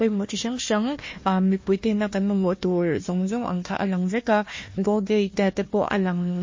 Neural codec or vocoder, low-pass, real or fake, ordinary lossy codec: codec, 16 kHz, 1 kbps, FunCodec, trained on Chinese and English, 50 frames a second; 7.2 kHz; fake; MP3, 32 kbps